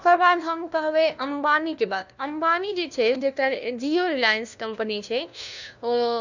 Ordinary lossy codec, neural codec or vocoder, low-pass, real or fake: none; codec, 16 kHz, 1 kbps, FunCodec, trained on LibriTTS, 50 frames a second; 7.2 kHz; fake